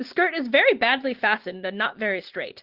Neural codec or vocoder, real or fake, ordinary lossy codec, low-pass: none; real; Opus, 16 kbps; 5.4 kHz